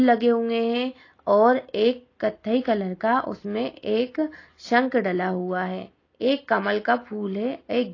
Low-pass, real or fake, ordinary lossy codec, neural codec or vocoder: 7.2 kHz; real; AAC, 32 kbps; none